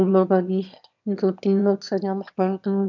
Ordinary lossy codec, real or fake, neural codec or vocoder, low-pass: none; fake; autoencoder, 22.05 kHz, a latent of 192 numbers a frame, VITS, trained on one speaker; 7.2 kHz